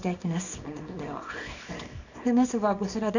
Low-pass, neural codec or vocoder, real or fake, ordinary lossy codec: 7.2 kHz; codec, 24 kHz, 0.9 kbps, WavTokenizer, small release; fake; none